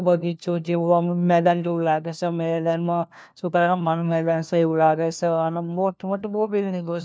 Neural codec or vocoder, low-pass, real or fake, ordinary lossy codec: codec, 16 kHz, 1 kbps, FunCodec, trained on LibriTTS, 50 frames a second; none; fake; none